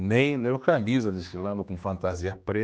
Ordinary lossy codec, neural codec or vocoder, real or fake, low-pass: none; codec, 16 kHz, 2 kbps, X-Codec, HuBERT features, trained on general audio; fake; none